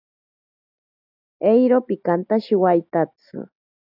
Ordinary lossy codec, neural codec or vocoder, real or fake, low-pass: AAC, 48 kbps; none; real; 5.4 kHz